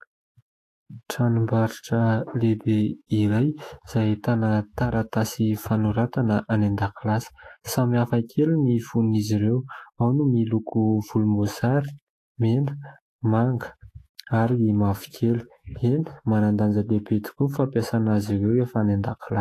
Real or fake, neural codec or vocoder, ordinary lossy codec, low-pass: fake; autoencoder, 48 kHz, 128 numbers a frame, DAC-VAE, trained on Japanese speech; AAC, 48 kbps; 14.4 kHz